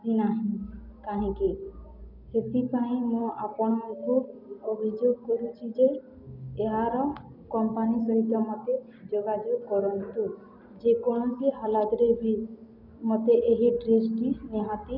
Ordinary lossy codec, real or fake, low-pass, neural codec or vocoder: none; real; 5.4 kHz; none